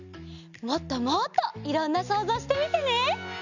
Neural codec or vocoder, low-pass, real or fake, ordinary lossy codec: none; 7.2 kHz; real; none